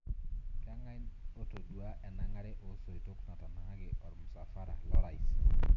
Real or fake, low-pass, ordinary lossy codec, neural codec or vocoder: real; 7.2 kHz; none; none